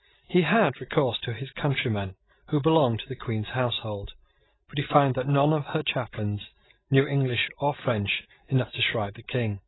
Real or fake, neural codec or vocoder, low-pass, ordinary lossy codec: real; none; 7.2 kHz; AAC, 16 kbps